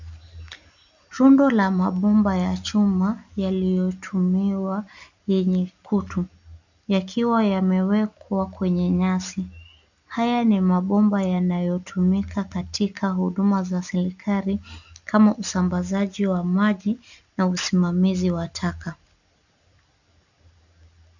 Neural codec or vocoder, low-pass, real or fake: none; 7.2 kHz; real